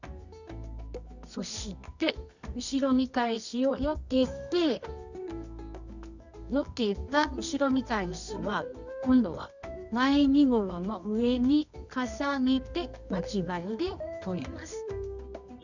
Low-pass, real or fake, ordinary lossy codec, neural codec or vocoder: 7.2 kHz; fake; none; codec, 24 kHz, 0.9 kbps, WavTokenizer, medium music audio release